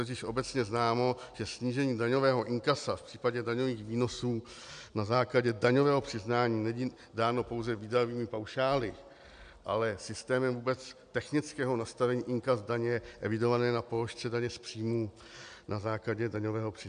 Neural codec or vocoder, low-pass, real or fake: none; 9.9 kHz; real